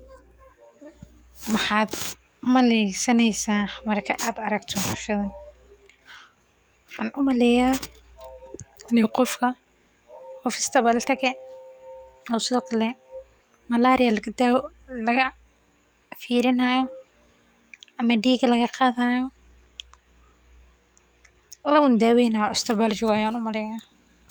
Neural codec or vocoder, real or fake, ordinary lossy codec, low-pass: codec, 44.1 kHz, 7.8 kbps, DAC; fake; none; none